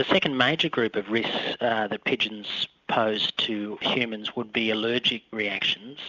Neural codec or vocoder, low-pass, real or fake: none; 7.2 kHz; real